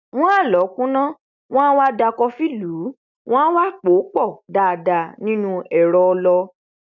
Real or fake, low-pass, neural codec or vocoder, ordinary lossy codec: real; 7.2 kHz; none; none